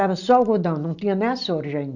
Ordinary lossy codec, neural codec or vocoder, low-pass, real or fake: none; none; 7.2 kHz; real